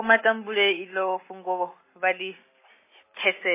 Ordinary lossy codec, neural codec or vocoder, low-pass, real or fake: MP3, 16 kbps; none; 3.6 kHz; real